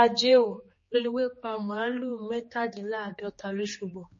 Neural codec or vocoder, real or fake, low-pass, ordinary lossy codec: codec, 16 kHz, 2 kbps, X-Codec, HuBERT features, trained on general audio; fake; 7.2 kHz; MP3, 32 kbps